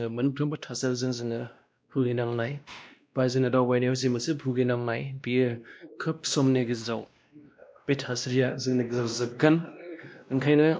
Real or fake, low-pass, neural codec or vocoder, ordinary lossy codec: fake; none; codec, 16 kHz, 1 kbps, X-Codec, WavLM features, trained on Multilingual LibriSpeech; none